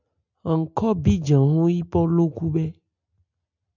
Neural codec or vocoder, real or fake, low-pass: none; real; 7.2 kHz